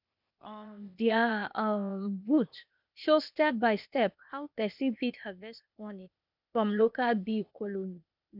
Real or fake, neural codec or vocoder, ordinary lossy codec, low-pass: fake; codec, 16 kHz, 0.8 kbps, ZipCodec; none; 5.4 kHz